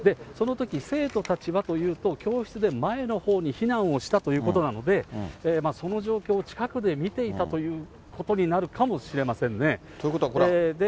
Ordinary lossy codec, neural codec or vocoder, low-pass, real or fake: none; none; none; real